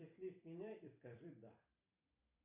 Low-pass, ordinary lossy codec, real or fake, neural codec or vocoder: 3.6 kHz; AAC, 24 kbps; fake; vocoder, 44.1 kHz, 128 mel bands every 256 samples, BigVGAN v2